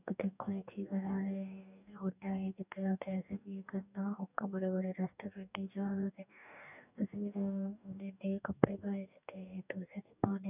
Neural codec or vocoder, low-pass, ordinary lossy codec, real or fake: codec, 44.1 kHz, 2.6 kbps, DAC; 3.6 kHz; none; fake